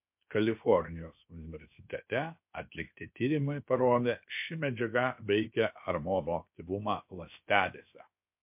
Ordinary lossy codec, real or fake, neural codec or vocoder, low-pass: MP3, 32 kbps; fake; codec, 16 kHz, 0.7 kbps, FocalCodec; 3.6 kHz